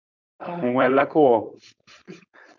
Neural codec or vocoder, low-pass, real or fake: codec, 16 kHz, 4.8 kbps, FACodec; 7.2 kHz; fake